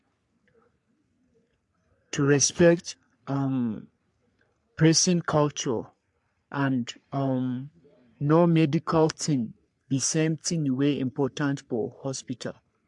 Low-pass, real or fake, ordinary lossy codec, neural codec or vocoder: 10.8 kHz; fake; MP3, 64 kbps; codec, 44.1 kHz, 3.4 kbps, Pupu-Codec